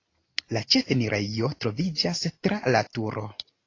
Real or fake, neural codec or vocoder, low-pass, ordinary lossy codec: real; none; 7.2 kHz; AAC, 32 kbps